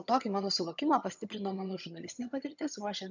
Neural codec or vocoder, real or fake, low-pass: vocoder, 22.05 kHz, 80 mel bands, HiFi-GAN; fake; 7.2 kHz